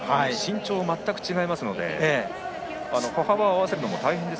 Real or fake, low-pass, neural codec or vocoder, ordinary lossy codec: real; none; none; none